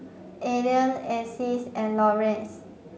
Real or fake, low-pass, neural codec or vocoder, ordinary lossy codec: real; none; none; none